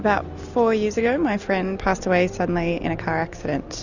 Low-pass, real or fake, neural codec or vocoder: 7.2 kHz; real; none